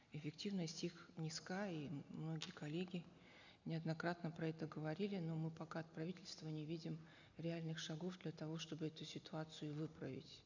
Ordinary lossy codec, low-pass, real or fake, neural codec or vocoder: none; 7.2 kHz; real; none